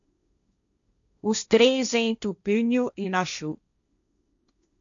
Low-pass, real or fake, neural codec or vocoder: 7.2 kHz; fake; codec, 16 kHz, 1.1 kbps, Voila-Tokenizer